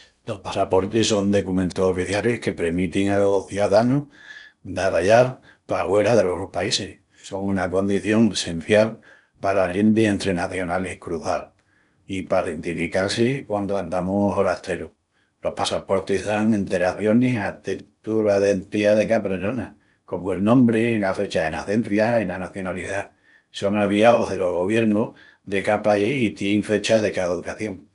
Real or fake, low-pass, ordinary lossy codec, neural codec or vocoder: fake; 10.8 kHz; none; codec, 16 kHz in and 24 kHz out, 0.8 kbps, FocalCodec, streaming, 65536 codes